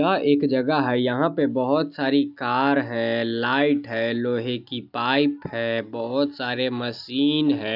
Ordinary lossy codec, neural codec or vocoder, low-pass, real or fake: none; none; 5.4 kHz; real